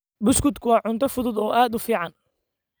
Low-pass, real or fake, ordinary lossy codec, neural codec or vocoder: none; real; none; none